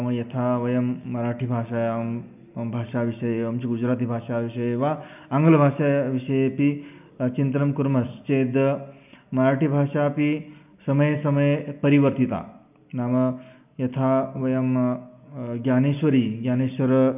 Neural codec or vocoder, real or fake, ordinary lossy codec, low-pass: none; real; none; 3.6 kHz